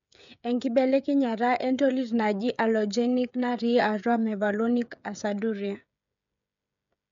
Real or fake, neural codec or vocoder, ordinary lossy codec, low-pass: fake; codec, 16 kHz, 16 kbps, FreqCodec, smaller model; MP3, 64 kbps; 7.2 kHz